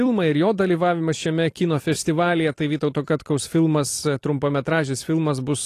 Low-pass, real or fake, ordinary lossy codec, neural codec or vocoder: 14.4 kHz; fake; AAC, 48 kbps; vocoder, 44.1 kHz, 128 mel bands every 256 samples, BigVGAN v2